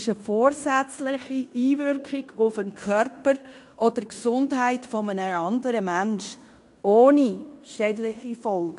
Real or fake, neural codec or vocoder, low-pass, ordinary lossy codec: fake; codec, 16 kHz in and 24 kHz out, 0.9 kbps, LongCat-Audio-Codec, fine tuned four codebook decoder; 10.8 kHz; AAC, 64 kbps